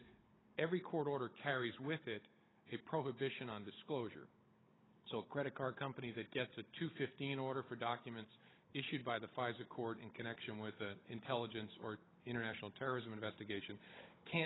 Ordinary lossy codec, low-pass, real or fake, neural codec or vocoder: AAC, 16 kbps; 7.2 kHz; fake; codec, 16 kHz, 16 kbps, FunCodec, trained on Chinese and English, 50 frames a second